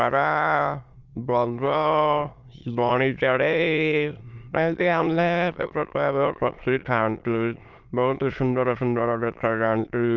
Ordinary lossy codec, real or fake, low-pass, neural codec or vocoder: Opus, 32 kbps; fake; 7.2 kHz; autoencoder, 22.05 kHz, a latent of 192 numbers a frame, VITS, trained on many speakers